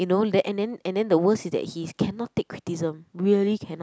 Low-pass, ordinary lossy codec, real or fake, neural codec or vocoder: none; none; real; none